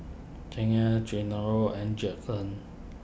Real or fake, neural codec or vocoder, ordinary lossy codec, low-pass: real; none; none; none